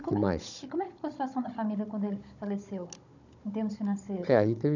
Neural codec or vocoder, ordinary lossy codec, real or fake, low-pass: codec, 16 kHz, 16 kbps, FunCodec, trained on Chinese and English, 50 frames a second; none; fake; 7.2 kHz